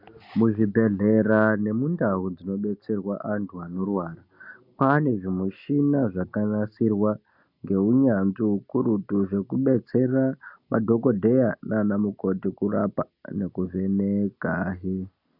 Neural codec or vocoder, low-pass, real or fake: none; 5.4 kHz; real